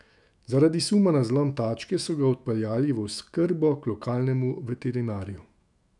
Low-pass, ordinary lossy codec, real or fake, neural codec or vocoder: 10.8 kHz; none; fake; autoencoder, 48 kHz, 128 numbers a frame, DAC-VAE, trained on Japanese speech